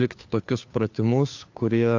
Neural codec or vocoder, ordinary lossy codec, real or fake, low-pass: codec, 16 kHz, 2 kbps, FunCodec, trained on Chinese and English, 25 frames a second; AAC, 48 kbps; fake; 7.2 kHz